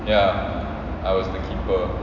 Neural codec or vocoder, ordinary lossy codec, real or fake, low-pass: none; AAC, 48 kbps; real; 7.2 kHz